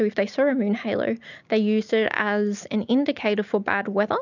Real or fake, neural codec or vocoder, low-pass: real; none; 7.2 kHz